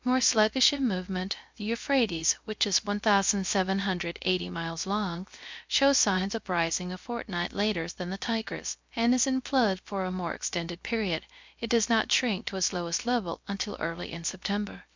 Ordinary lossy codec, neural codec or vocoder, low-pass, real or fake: MP3, 64 kbps; codec, 16 kHz, 0.3 kbps, FocalCodec; 7.2 kHz; fake